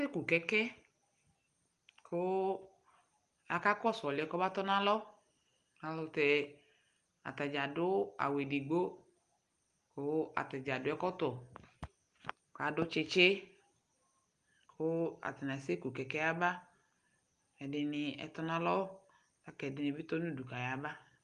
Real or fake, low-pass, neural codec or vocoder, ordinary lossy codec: real; 10.8 kHz; none; Opus, 32 kbps